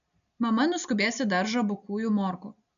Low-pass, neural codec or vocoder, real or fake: 7.2 kHz; none; real